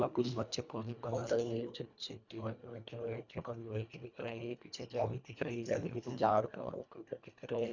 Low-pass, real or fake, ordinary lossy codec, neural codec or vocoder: 7.2 kHz; fake; none; codec, 24 kHz, 1.5 kbps, HILCodec